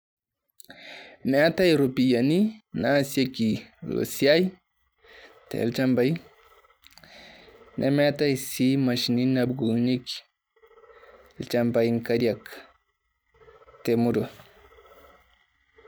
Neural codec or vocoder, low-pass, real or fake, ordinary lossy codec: none; none; real; none